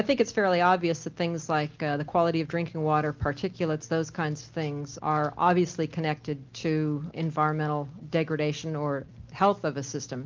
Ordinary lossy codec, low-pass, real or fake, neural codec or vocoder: Opus, 24 kbps; 7.2 kHz; real; none